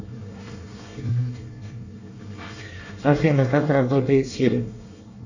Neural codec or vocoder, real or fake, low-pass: codec, 24 kHz, 1 kbps, SNAC; fake; 7.2 kHz